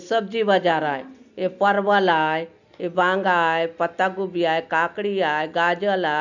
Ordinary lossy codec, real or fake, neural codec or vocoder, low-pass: none; real; none; 7.2 kHz